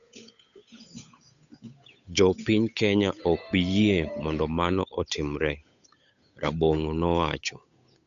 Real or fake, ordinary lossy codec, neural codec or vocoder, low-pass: fake; none; codec, 16 kHz, 8 kbps, FunCodec, trained on Chinese and English, 25 frames a second; 7.2 kHz